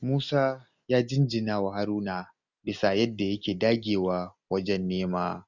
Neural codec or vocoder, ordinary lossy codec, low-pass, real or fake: none; none; 7.2 kHz; real